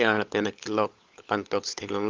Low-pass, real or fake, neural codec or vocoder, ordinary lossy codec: 7.2 kHz; fake; codec, 16 kHz, 16 kbps, FunCodec, trained on LibriTTS, 50 frames a second; Opus, 24 kbps